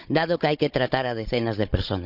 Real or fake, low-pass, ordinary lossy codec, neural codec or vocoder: fake; 5.4 kHz; none; codec, 16 kHz, 16 kbps, FunCodec, trained on Chinese and English, 50 frames a second